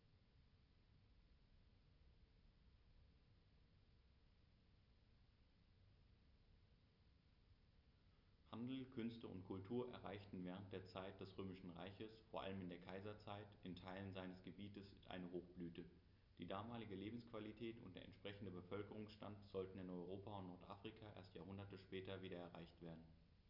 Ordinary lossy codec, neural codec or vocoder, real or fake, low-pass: none; none; real; 5.4 kHz